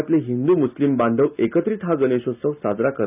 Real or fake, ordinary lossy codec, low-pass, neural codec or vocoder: real; none; 3.6 kHz; none